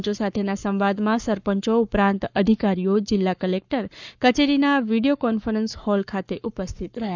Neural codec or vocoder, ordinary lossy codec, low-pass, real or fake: codec, 44.1 kHz, 7.8 kbps, Pupu-Codec; none; 7.2 kHz; fake